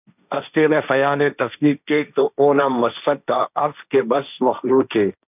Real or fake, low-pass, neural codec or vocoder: fake; 3.6 kHz; codec, 16 kHz, 1.1 kbps, Voila-Tokenizer